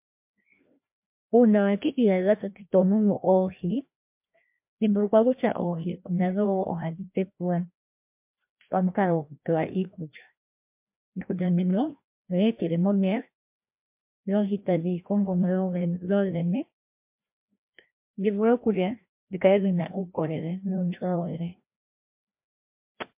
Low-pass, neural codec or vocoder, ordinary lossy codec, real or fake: 3.6 kHz; codec, 16 kHz, 1 kbps, FreqCodec, larger model; MP3, 32 kbps; fake